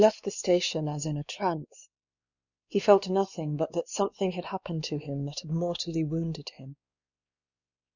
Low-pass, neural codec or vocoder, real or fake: 7.2 kHz; codec, 16 kHz, 2 kbps, X-Codec, WavLM features, trained on Multilingual LibriSpeech; fake